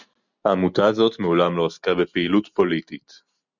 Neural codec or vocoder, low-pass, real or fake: none; 7.2 kHz; real